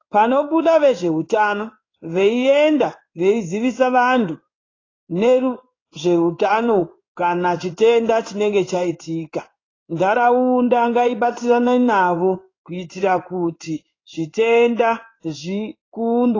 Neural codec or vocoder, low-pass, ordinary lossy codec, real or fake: codec, 16 kHz in and 24 kHz out, 1 kbps, XY-Tokenizer; 7.2 kHz; AAC, 32 kbps; fake